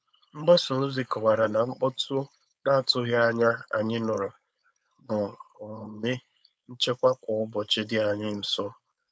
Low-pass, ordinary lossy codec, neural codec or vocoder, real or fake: none; none; codec, 16 kHz, 4.8 kbps, FACodec; fake